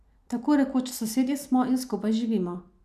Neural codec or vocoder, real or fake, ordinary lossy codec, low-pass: none; real; none; 14.4 kHz